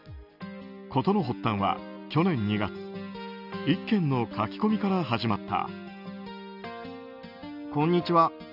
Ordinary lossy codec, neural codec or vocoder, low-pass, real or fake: none; none; 5.4 kHz; real